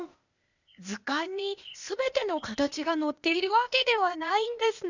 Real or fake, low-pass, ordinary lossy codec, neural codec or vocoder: fake; 7.2 kHz; none; codec, 16 kHz, 0.8 kbps, ZipCodec